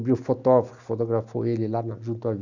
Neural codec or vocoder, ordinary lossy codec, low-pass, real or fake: none; none; 7.2 kHz; real